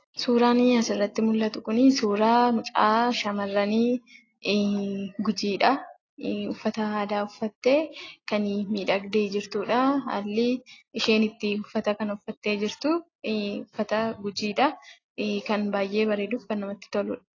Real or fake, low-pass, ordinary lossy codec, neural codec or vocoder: real; 7.2 kHz; AAC, 32 kbps; none